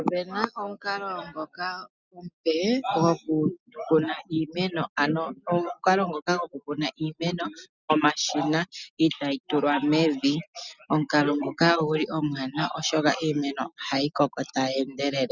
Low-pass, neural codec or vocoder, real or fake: 7.2 kHz; none; real